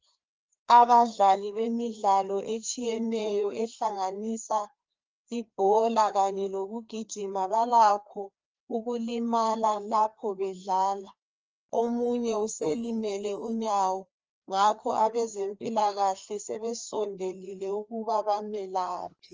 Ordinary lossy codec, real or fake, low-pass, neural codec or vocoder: Opus, 24 kbps; fake; 7.2 kHz; codec, 16 kHz, 2 kbps, FreqCodec, larger model